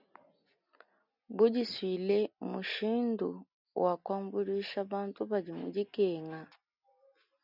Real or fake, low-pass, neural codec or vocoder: real; 5.4 kHz; none